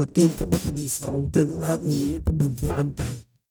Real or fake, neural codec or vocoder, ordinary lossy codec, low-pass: fake; codec, 44.1 kHz, 0.9 kbps, DAC; none; none